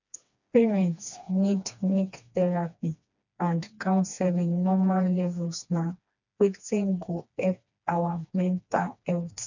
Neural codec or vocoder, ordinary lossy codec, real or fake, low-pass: codec, 16 kHz, 2 kbps, FreqCodec, smaller model; none; fake; 7.2 kHz